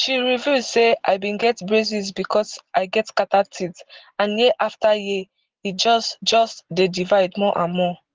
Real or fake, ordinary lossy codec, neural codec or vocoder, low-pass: real; Opus, 16 kbps; none; 7.2 kHz